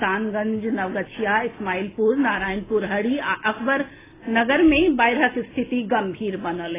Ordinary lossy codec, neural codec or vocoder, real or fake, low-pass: AAC, 16 kbps; none; real; 3.6 kHz